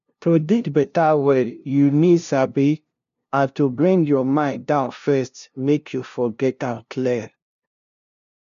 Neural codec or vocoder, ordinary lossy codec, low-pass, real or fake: codec, 16 kHz, 0.5 kbps, FunCodec, trained on LibriTTS, 25 frames a second; MP3, 64 kbps; 7.2 kHz; fake